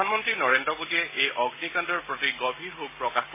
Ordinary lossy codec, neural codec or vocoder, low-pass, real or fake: MP3, 16 kbps; none; 3.6 kHz; real